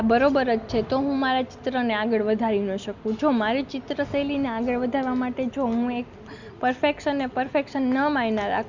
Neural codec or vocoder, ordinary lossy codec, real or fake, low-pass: none; Opus, 64 kbps; real; 7.2 kHz